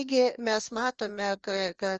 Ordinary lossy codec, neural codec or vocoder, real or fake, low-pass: Opus, 24 kbps; codec, 16 kHz, 2 kbps, FreqCodec, larger model; fake; 7.2 kHz